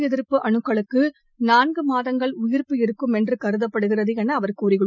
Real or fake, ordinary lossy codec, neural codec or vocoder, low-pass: real; none; none; 7.2 kHz